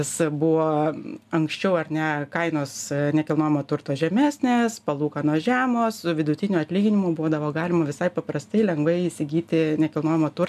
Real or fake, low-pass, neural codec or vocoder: real; 14.4 kHz; none